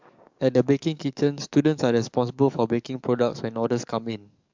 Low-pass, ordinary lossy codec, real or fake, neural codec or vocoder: 7.2 kHz; MP3, 64 kbps; fake; codec, 44.1 kHz, 7.8 kbps, DAC